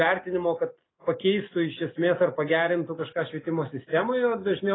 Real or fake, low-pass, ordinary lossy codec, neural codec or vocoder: real; 7.2 kHz; AAC, 16 kbps; none